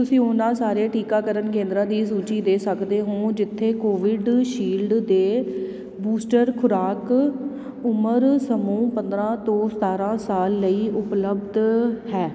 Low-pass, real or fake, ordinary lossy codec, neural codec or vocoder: none; real; none; none